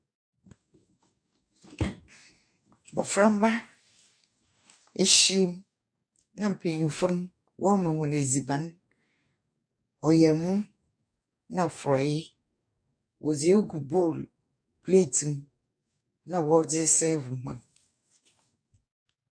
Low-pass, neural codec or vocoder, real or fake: 9.9 kHz; codec, 44.1 kHz, 2.6 kbps, DAC; fake